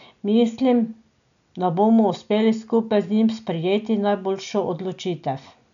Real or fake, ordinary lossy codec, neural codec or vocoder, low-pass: real; none; none; 7.2 kHz